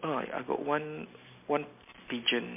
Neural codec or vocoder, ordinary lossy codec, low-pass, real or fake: none; MP3, 24 kbps; 3.6 kHz; real